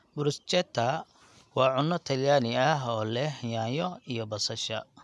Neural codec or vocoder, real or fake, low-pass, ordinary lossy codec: none; real; none; none